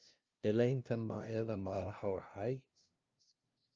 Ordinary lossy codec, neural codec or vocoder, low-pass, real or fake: Opus, 24 kbps; codec, 16 kHz, 0.5 kbps, FunCodec, trained on LibriTTS, 25 frames a second; 7.2 kHz; fake